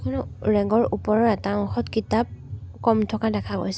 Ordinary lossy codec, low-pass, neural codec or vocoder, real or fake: none; none; none; real